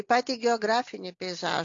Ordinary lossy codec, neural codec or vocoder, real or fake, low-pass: AAC, 48 kbps; none; real; 7.2 kHz